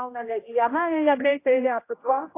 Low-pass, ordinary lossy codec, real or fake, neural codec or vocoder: 3.6 kHz; AAC, 24 kbps; fake; codec, 16 kHz, 0.5 kbps, X-Codec, HuBERT features, trained on general audio